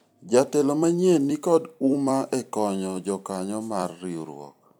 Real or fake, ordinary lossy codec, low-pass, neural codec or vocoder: fake; none; none; vocoder, 44.1 kHz, 128 mel bands every 256 samples, BigVGAN v2